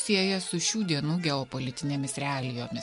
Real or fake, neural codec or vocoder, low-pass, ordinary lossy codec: real; none; 10.8 kHz; MP3, 64 kbps